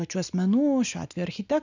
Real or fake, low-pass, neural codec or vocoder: real; 7.2 kHz; none